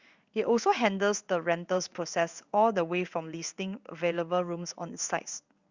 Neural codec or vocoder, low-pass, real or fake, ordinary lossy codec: codec, 16 kHz in and 24 kHz out, 1 kbps, XY-Tokenizer; 7.2 kHz; fake; Opus, 64 kbps